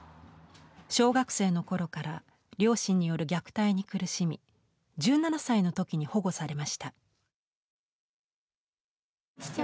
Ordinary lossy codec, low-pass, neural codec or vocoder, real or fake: none; none; none; real